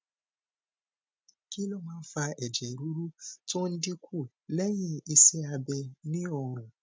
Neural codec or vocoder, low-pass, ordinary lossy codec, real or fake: none; none; none; real